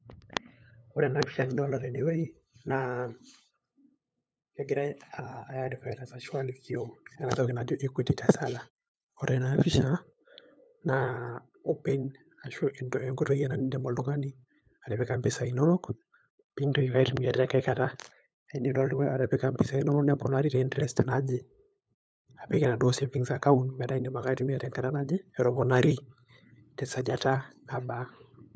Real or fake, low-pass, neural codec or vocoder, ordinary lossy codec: fake; none; codec, 16 kHz, 8 kbps, FunCodec, trained on LibriTTS, 25 frames a second; none